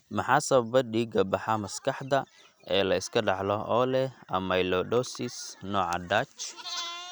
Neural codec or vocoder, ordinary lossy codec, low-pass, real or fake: none; none; none; real